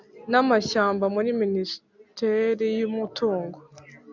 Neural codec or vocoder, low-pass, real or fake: none; 7.2 kHz; real